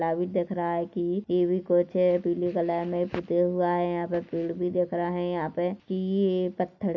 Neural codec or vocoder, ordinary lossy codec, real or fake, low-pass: none; none; real; 7.2 kHz